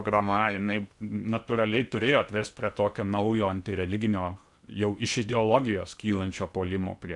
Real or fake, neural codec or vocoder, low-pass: fake; codec, 16 kHz in and 24 kHz out, 0.8 kbps, FocalCodec, streaming, 65536 codes; 10.8 kHz